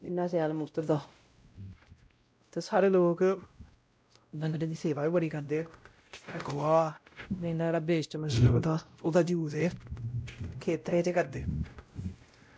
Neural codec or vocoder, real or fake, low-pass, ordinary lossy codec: codec, 16 kHz, 0.5 kbps, X-Codec, WavLM features, trained on Multilingual LibriSpeech; fake; none; none